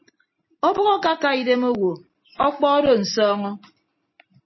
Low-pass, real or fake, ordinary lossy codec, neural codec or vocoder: 7.2 kHz; real; MP3, 24 kbps; none